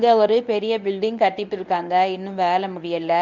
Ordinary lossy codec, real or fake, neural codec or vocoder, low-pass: none; fake; codec, 24 kHz, 0.9 kbps, WavTokenizer, medium speech release version 1; 7.2 kHz